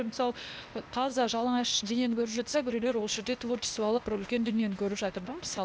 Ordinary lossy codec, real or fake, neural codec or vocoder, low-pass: none; fake; codec, 16 kHz, 0.8 kbps, ZipCodec; none